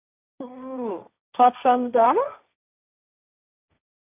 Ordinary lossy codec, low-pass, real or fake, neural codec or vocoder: none; 3.6 kHz; fake; codec, 16 kHz, 1.1 kbps, Voila-Tokenizer